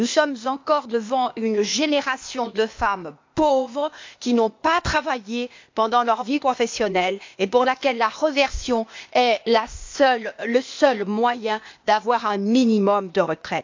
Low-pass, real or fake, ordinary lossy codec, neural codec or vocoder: 7.2 kHz; fake; MP3, 64 kbps; codec, 16 kHz, 0.8 kbps, ZipCodec